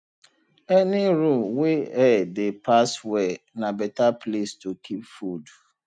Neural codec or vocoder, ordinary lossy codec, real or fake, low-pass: none; none; real; 9.9 kHz